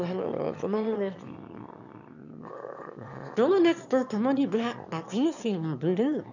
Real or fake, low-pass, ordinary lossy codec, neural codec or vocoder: fake; 7.2 kHz; none; autoencoder, 22.05 kHz, a latent of 192 numbers a frame, VITS, trained on one speaker